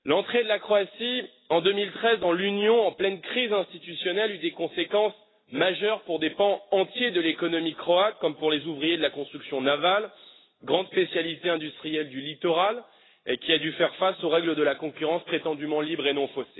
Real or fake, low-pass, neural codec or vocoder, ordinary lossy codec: real; 7.2 kHz; none; AAC, 16 kbps